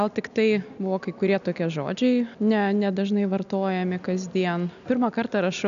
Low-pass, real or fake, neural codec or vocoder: 7.2 kHz; real; none